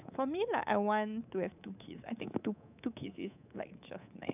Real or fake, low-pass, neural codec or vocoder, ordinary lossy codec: fake; 3.6 kHz; codec, 24 kHz, 3.1 kbps, DualCodec; none